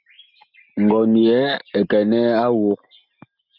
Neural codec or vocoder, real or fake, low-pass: none; real; 5.4 kHz